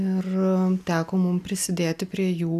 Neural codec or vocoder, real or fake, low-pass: none; real; 14.4 kHz